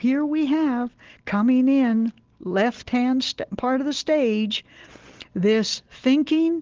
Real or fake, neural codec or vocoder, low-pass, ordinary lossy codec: real; none; 7.2 kHz; Opus, 16 kbps